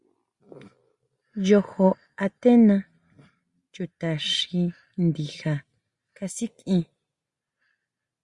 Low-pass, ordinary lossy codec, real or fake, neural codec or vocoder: 10.8 kHz; Opus, 64 kbps; real; none